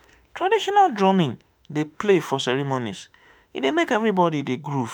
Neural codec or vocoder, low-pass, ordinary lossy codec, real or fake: autoencoder, 48 kHz, 32 numbers a frame, DAC-VAE, trained on Japanese speech; none; none; fake